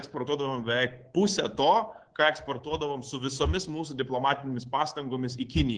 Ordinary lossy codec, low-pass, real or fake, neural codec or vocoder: Opus, 16 kbps; 9.9 kHz; fake; codec, 24 kHz, 3.1 kbps, DualCodec